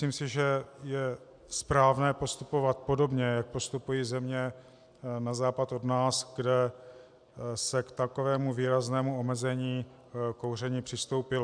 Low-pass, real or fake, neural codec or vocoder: 9.9 kHz; real; none